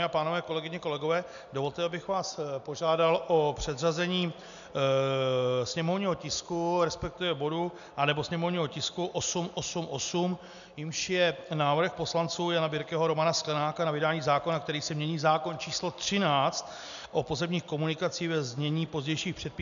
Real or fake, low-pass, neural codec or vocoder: real; 7.2 kHz; none